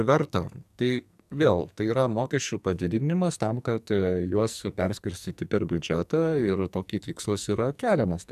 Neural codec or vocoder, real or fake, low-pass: codec, 44.1 kHz, 2.6 kbps, SNAC; fake; 14.4 kHz